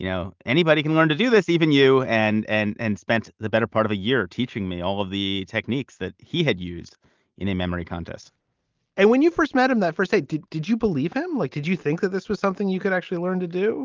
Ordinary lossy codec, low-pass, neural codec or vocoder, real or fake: Opus, 24 kbps; 7.2 kHz; none; real